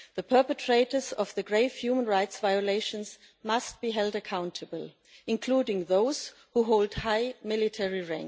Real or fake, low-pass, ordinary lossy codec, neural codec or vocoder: real; none; none; none